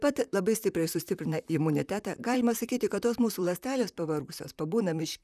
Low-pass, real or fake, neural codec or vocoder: 14.4 kHz; fake; vocoder, 44.1 kHz, 128 mel bands, Pupu-Vocoder